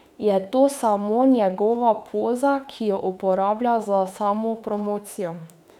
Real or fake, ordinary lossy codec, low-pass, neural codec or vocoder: fake; none; 19.8 kHz; autoencoder, 48 kHz, 32 numbers a frame, DAC-VAE, trained on Japanese speech